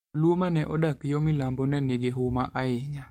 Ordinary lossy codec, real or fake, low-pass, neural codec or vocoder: MP3, 64 kbps; fake; 19.8 kHz; codec, 44.1 kHz, 7.8 kbps, DAC